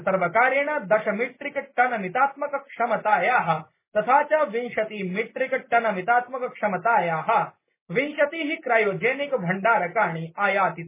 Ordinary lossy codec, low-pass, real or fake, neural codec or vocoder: MP3, 16 kbps; 3.6 kHz; real; none